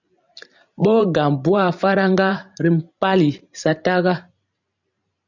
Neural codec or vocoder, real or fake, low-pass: vocoder, 44.1 kHz, 128 mel bands every 512 samples, BigVGAN v2; fake; 7.2 kHz